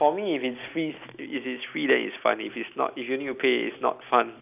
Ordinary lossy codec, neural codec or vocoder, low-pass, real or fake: none; none; 3.6 kHz; real